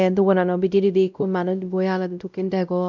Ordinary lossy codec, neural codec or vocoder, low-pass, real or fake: none; codec, 16 kHz, 0.5 kbps, X-Codec, WavLM features, trained on Multilingual LibriSpeech; 7.2 kHz; fake